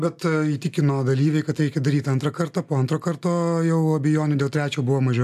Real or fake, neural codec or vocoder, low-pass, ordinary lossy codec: real; none; 14.4 kHz; AAC, 96 kbps